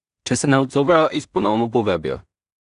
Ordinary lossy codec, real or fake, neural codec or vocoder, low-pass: none; fake; codec, 16 kHz in and 24 kHz out, 0.4 kbps, LongCat-Audio-Codec, two codebook decoder; 10.8 kHz